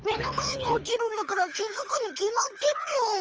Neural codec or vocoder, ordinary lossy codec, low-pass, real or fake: codec, 24 kHz, 3 kbps, HILCodec; Opus, 24 kbps; 7.2 kHz; fake